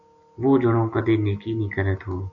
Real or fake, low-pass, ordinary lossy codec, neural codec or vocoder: real; 7.2 kHz; Opus, 64 kbps; none